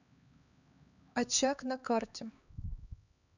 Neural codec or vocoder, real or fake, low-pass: codec, 16 kHz, 2 kbps, X-Codec, HuBERT features, trained on LibriSpeech; fake; 7.2 kHz